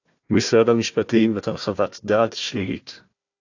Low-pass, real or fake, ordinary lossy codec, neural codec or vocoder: 7.2 kHz; fake; AAC, 48 kbps; codec, 16 kHz, 1 kbps, FunCodec, trained on Chinese and English, 50 frames a second